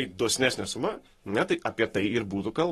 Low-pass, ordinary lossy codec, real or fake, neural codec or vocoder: 19.8 kHz; AAC, 32 kbps; fake; codec, 44.1 kHz, 7.8 kbps, Pupu-Codec